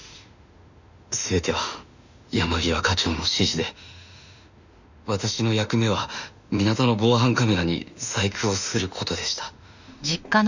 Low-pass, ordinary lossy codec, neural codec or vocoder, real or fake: 7.2 kHz; none; autoencoder, 48 kHz, 32 numbers a frame, DAC-VAE, trained on Japanese speech; fake